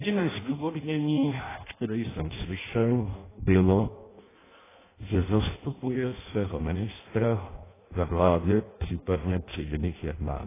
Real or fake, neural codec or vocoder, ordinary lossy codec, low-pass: fake; codec, 16 kHz in and 24 kHz out, 0.6 kbps, FireRedTTS-2 codec; AAC, 16 kbps; 3.6 kHz